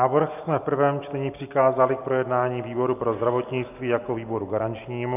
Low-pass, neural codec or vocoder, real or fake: 3.6 kHz; none; real